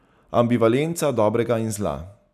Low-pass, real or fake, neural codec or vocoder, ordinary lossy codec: 14.4 kHz; real; none; none